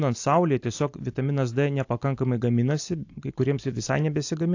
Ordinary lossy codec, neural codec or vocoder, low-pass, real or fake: AAC, 48 kbps; none; 7.2 kHz; real